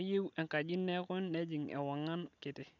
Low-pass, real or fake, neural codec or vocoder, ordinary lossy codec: 7.2 kHz; real; none; none